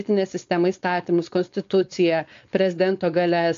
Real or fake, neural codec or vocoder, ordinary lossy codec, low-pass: fake; codec, 16 kHz, 4.8 kbps, FACodec; AAC, 48 kbps; 7.2 kHz